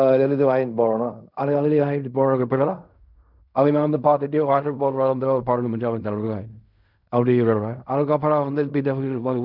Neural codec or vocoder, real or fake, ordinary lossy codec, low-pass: codec, 16 kHz in and 24 kHz out, 0.4 kbps, LongCat-Audio-Codec, fine tuned four codebook decoder; fake; none; 5.4 kHz